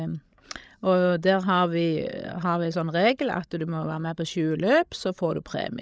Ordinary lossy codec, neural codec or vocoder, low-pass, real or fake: none; codec, 16 kHz, 16 kbps, FreqCodec, larger model; none; fake